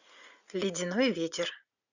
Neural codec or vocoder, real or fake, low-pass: vocoder, 44.1 kHz, 80 mel bands, Vocos; fake; 7.2 kHz